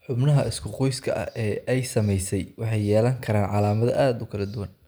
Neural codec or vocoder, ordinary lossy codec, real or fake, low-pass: none; none; real; none